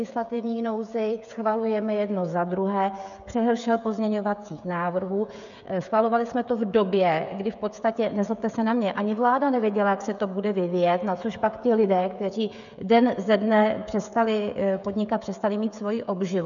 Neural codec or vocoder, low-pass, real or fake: codec, 16 kHz, 16 kbps, FreqCodec, smaller model; 7.2 kHz; fake